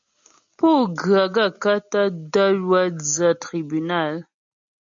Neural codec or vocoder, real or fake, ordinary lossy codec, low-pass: none; real; MP3, 64 kbps; 7.2 kHz